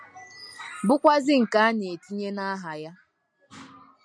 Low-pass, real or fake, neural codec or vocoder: 9.9 kHz; real; none